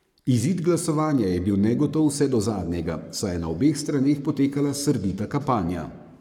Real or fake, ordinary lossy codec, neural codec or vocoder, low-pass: fake; none; codec, 44.1 kHz, 7.8 kbps, Pupu-Codec; 19.8 kHz